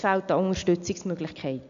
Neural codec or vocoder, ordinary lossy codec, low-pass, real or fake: none; MP3, 64 kbps; 7.2 kHz; real